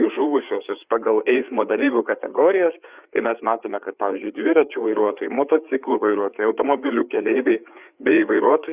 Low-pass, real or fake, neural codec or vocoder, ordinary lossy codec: 3.6 kHz; fake; codec, 16 kHz, 4 kbps, FreqCodec, larger model; Opus, 64 kbps